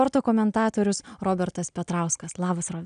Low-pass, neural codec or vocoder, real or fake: 9.9 kHz; none; real